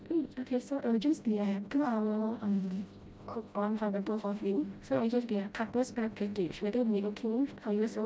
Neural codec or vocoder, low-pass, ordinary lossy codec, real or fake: codec, 16 kHz, 0.5 kbps, FreqCodec, smaller model; none; none; fake